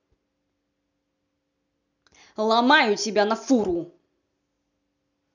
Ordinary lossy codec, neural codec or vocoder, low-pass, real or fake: none; none; 7.2 kHz; real